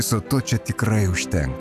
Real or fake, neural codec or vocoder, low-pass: real; none; 14.4 kHz